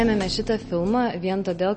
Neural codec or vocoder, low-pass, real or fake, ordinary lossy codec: none; 10.8 kHz; real; MP3, 32 kbps